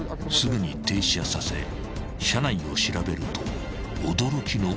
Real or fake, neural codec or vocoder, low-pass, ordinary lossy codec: real; none; none; none